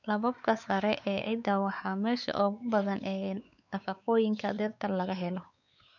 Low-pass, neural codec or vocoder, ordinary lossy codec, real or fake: 7.2 kHz; codec, 16 kHz, 4 kbps, X-Codec, WavLM features, trained on Multilingual LibriSpeech; none; fake